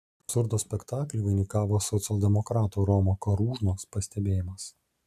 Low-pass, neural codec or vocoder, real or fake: 14.4 kHz; none; real